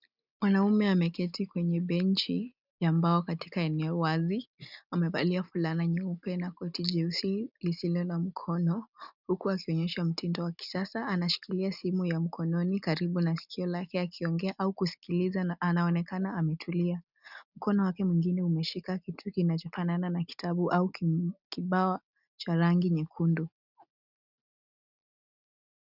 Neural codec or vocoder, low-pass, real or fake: none; 5.4 kHz; real